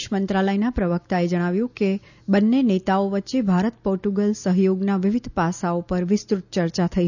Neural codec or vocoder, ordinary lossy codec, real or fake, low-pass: none; none; real; 7.2 kHz